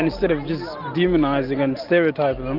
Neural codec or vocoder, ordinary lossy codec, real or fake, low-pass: none; Opus, 32 kbps; real; 5.4 kHz